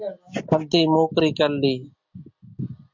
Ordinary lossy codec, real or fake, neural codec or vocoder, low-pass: MP3, 64 kbps; real; none; 7.2 kHz